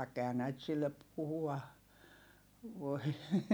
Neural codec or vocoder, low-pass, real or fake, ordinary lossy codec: none; none; real; none